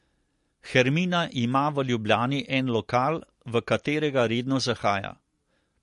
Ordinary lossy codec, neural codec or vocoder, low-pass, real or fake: MP3, 48 kbps; none; 14.4 kHz; real